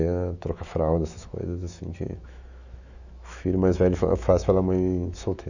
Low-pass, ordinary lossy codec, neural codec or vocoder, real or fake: 7.2 kHz; none; autoencoder, 48 kHz, 128 numbers a frame, DAC-VAE, trained on Japanese speech; fake